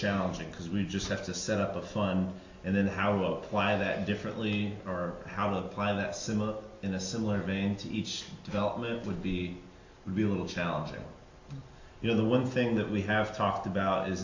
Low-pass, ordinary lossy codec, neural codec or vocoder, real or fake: 7.2 kHz; AAC, 48 kbps; none; real